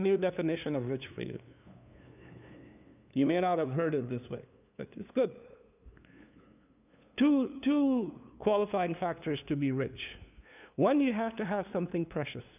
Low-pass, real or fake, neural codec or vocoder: 3.6 kHz; fake; codec, 16 kHz, 2 kbps, FunCodec, trained on LibriTTS, 25 frames a second